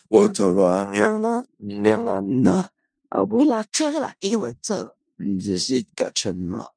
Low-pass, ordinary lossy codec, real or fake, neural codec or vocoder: 9.9 kHz; none; fake; codec, 16 kHz in and 24 kHz out, 0.4 kbps, LongCat-Audio-Codec, four codebook decoder